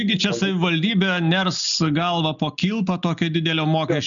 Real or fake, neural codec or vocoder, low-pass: real; none; 7.2 kHz